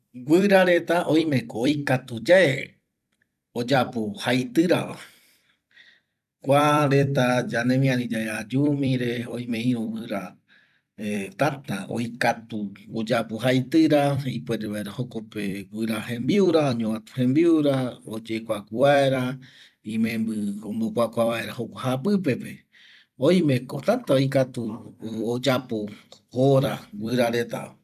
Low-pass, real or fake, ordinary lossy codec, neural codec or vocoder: 14.4 kHz; fake; none; vocoder, 44.1 kHz, 128 mel bands every 256 samples, BigVGAN v2